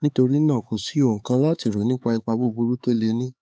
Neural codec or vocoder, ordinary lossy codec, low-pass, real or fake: codec, 16 kHz, 4 kbps, X-Codec, HuBERT features, trained on LibriSpeech; none; none; fake